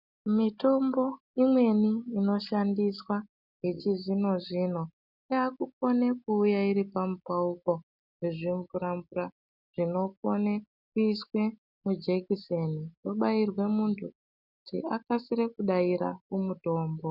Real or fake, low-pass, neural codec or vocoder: real; 5.4 kHz; none